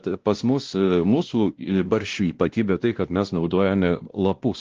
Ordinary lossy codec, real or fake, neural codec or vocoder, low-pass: Opus, 16 kbps; fake; codec, 16 kHz, 1 kbps, X-Codec, WavLM features, trained on Multilingual LibriSpeech; 7.2 kHz